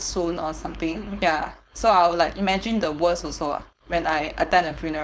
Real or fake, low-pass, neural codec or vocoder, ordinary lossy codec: fake; none; codec, 16 kHz, 4.8 kbps, FACodec; none